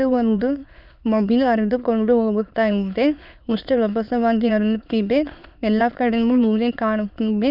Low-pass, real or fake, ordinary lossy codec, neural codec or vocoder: 5.4 kHz; fake; none; autoencoder, 22.05 kHz, a latent of 192 numbers a frame, VITS, trained on many speakers